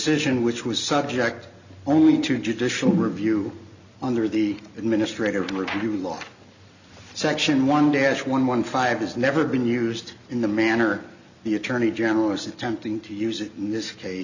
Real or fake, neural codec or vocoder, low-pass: real; none; 7.2 kHz